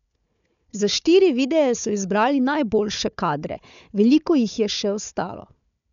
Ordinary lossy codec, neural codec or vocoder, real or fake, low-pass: none; codec, 16 kHz, 4 kbps, FunCodec, trained on Chinese and English, 50 frames a second; fake; 7.2 kHz